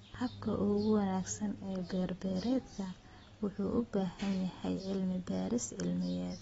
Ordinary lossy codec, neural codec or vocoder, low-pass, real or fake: AAC, 24 kbps; none; 19.8 kHz; real